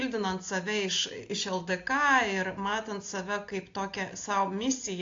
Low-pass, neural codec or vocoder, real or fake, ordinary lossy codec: 7.2 kHz; none; real; AAC, 48 kbps